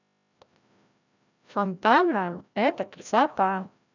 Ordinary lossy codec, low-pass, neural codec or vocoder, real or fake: none; 7.2 kHz; codec, 16 kHz, 0.5 kbps, FreqCodec, larger model; fake